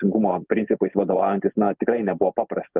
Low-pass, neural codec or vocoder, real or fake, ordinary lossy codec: 3.6 kHz; none; real; Opus, 32 kbps